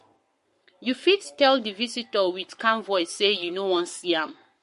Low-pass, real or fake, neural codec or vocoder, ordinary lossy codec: 14.4 kHz; fake; codec, 44.1 kHz, 7.8 kbps, Pupu-Codec; MP3, 48 kbps